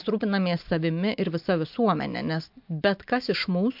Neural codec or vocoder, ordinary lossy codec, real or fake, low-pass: none; MP3, 48 kbps; real; 5.4 kHz